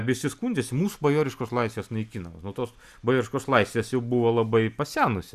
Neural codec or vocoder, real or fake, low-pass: none; real; 14.4 kHz